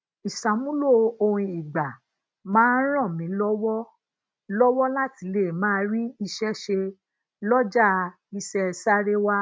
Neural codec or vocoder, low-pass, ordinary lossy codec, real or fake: none; none; none; real